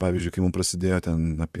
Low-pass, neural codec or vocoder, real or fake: 14.4 kHz; vocoder, 44.1 kHz, 128 mel bands, Pupu-Vocoder; fake